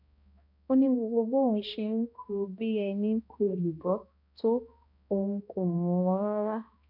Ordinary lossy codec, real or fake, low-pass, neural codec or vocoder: none; fake; 5.4 kHz; codec, 16 kHz, 1 kbps, X-Codec, HuBERT features, trained on balanced general audio